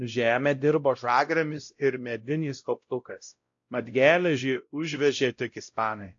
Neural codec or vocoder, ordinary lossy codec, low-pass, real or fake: codec, 16 kHz, 0.5 kbps, X-Codec, WavLM features, trained on Multilingual LibriSpeech; AAC, 48 kbps; 7.2 kHz; fake